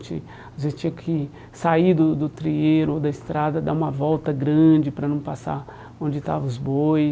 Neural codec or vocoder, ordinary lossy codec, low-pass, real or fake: none; none; none; real